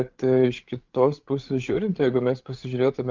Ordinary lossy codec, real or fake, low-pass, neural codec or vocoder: Opus, 24 kbps; fake; 7.2 kHz; codec, 16 kHz, 8 kbps, FreqCodec, larger model